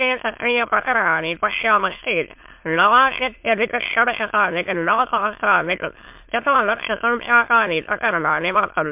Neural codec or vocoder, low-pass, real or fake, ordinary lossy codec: autoencoder, 22.05 kHz, a latent of 192 numbers a frame, VITS, trained on many speakers; 3.6 kHz; fake; MP3, 32 kbps